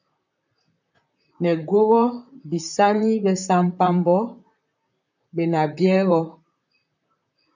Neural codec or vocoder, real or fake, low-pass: vocoder, 44.1 kHz, 128 mel bands, Pupu-Vocoder; fake; 7.2 kHz